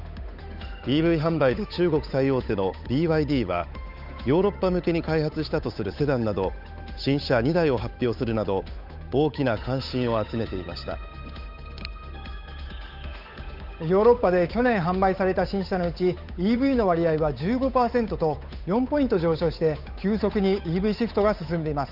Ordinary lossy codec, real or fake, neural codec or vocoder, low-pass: none; fake; codec, 16 kHz, 8 kbps, FunCodec, trained on Chinese and English, 25 frames a second; 5.4 kHz